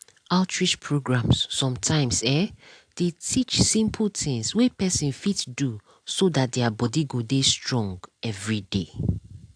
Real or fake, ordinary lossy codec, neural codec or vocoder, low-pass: real; AAC, 64 kbps; none; 9.9 kHz